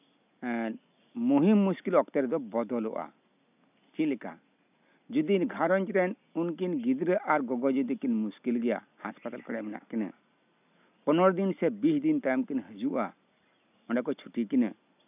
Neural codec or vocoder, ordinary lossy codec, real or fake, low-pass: none; none; real; 3.6 kHz